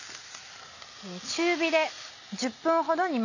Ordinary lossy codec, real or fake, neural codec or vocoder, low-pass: none; real; none; 7.2 kHz